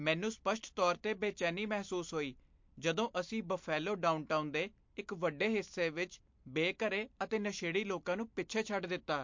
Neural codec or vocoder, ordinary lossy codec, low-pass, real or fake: none; MP3, 48 kbps; 7.2 kHz; real